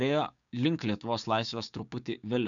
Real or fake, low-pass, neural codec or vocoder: fake; 7.2 kHz; codec, 16 kHz, 6 kbps, DAC